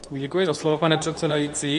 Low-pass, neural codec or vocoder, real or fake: 10.8 kHz; codec, 24 kHz, 0.9 kbps, WavTokenizer, medium speech release version 2; fake